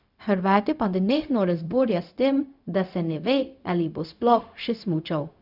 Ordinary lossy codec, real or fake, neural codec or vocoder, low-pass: none; fake; codec, 16 kHz, 0.4 kbps, LongCat-Audio-Codec; 5.4 kHz